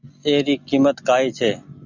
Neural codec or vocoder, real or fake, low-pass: none; real; 7.2 kHz